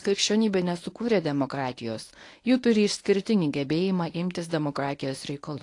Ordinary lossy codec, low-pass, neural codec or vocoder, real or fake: AAC, 48 kbps; 10.8 kHz; codec, 24 kHz, 0.9 kbps, WavTokenizer, medium speech release version 1; fake